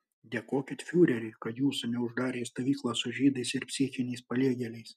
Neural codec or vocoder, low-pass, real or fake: none; 14.4 kHz; real